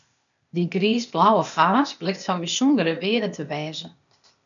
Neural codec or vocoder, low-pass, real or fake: codec, 16 kHz, 0.8 kbps, ZipCodec; 7.2 kHz; fake